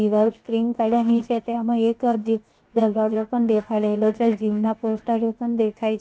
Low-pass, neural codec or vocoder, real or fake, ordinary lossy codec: none; codec, 16 kHz, 0.7 kbps, FocalCodec; fake; none